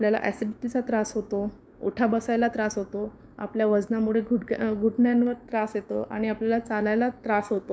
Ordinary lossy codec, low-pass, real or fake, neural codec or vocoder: none; none; fake; codec, 16 kHz, 6 kbps, DAC